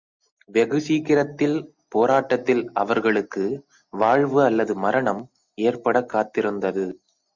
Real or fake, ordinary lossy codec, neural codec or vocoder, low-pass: real; Opus, 64 kbps; none; 7.2 kHz